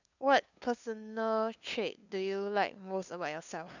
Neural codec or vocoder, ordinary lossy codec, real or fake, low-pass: codec, 16 kHz, 2 kbps, FunCodec, trained on LibriTTS, 25 frames a second; none; fake; 7.2 kHz